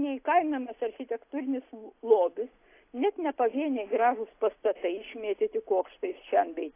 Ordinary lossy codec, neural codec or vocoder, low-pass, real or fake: AAC, 24 kbps; none; 3.6 kHz; real